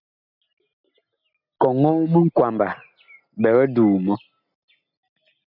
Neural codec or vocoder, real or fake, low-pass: none; real; 5.4 kHz